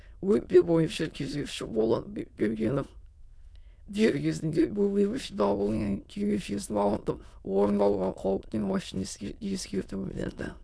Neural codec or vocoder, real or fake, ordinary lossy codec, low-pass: autoencoder, 22.05 kHz, a latent of 192 numbers a frame, VITS, trained on many speakers; fake; none; none